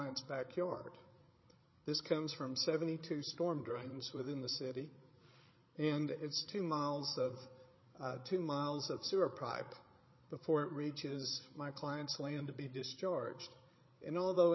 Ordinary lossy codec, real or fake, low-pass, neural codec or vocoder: MP3, 24 kbps; fake; 7.2 kHz; codec, 16 kHz, 8 kbps, FreqCodec, larger model